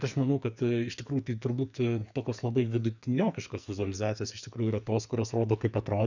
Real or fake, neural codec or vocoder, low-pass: fake; codec, 44.1 kHz, 2.6 kbps, SNAC; 7.2 kHz